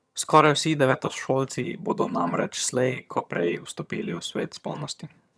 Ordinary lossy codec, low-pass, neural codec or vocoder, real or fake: none; none; vocoder, 22.05 kHz, 80 mel bands, HiFi-GAN; fake